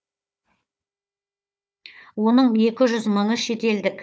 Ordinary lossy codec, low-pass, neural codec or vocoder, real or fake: none; none; codec, 16 kHz, 4 kbps, FunCodec, trained on Chinese and English, 50 frames a second; fake